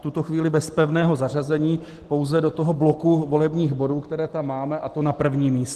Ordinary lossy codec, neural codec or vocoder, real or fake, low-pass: Opus, 16 kbps; none; real; 14.4 kHz